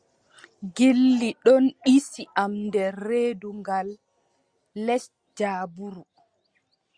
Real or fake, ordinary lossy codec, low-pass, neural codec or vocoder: real; Opus, 64 kbps; 9.9 kHz; none